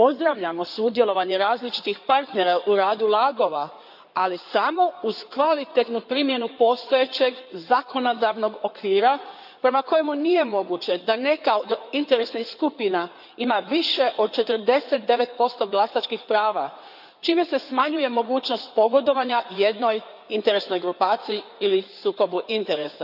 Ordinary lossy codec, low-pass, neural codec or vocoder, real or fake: none; 5.4 kHz; codec, 16 kHz in and 24 kHz out, 2.2 kbps, FireRedTTS-2 codec; fake